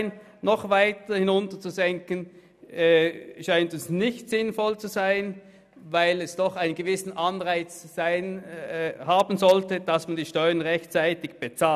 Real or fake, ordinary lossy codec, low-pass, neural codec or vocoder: real; none; 14.4 kHz; none